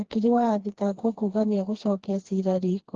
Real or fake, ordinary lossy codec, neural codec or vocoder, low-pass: fake; Opus, 16 kbps; codec, 16 kHz, 2 kbps, FreqCodec, smaller model; 7.2 kHz